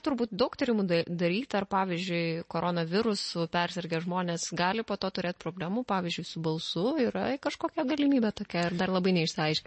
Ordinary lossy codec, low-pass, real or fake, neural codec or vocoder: MP3, 32 kbps; 10.8 kHz; real; none